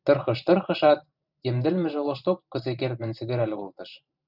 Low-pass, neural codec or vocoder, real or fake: 5.4 kHz; vocoder, 44.1 kHz, 128 mel bands every 512 samples, BigVGAN v2; fake